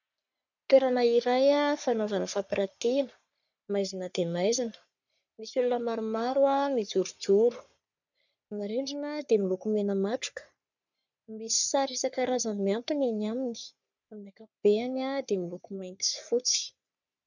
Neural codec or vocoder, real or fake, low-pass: codec, 44.1 kHz, 3.4 kbps, Pupu-Codec; fake; 7.2 kHz